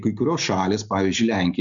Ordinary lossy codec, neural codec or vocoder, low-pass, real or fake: MP3, 96 kbps; none; 7.2 kHz; real